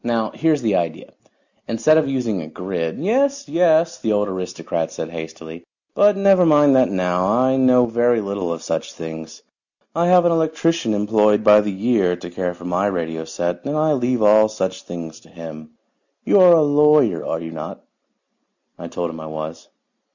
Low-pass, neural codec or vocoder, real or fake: 7.2 kHz; none; real